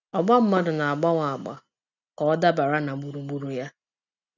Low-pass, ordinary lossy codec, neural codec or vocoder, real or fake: 7.2 kHz; none; none; real